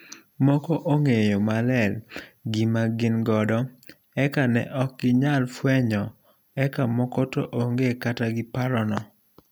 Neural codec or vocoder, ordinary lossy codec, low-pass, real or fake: none; none; none; real